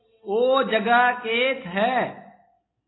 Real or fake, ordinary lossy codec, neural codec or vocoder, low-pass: real; AAC, 16 kbps; none; 7.2 kHz